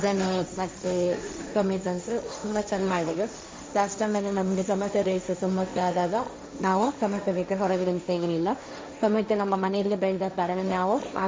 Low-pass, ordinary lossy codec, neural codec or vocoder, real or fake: none; none; codec, 16 kHz, 1.1 kbps, Voila-Tokenizer; fake